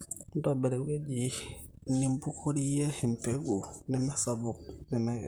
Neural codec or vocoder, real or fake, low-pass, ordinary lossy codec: vocoder, 44.1 kHz, 128 mel bands, Pupu-Vocoder; fake; none; none